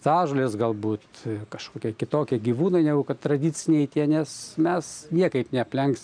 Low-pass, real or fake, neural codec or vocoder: 9.9 kHz; real; none